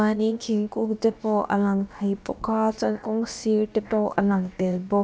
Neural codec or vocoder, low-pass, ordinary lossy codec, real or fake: codec, 16 kHz, about 1 kbps, DyCAST, with the encoder's durations; none; none; fake